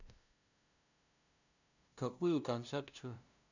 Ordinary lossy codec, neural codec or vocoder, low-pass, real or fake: none; codec, 16 kHz, 0.5 kbps, FunCodec, trained on LibriTTS, 25 frames a second; 7.2 kHz; fake